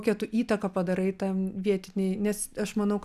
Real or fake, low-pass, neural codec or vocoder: real; 14.4 kHz; none